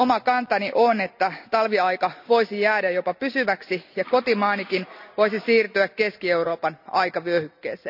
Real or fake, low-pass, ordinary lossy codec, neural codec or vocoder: real; 5.4 kHz; none; none